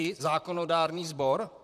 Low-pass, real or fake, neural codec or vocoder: 14.4 kHz; fake; vocoder, 44.1 kHz, 128 mel bands, Pupu-Vocoder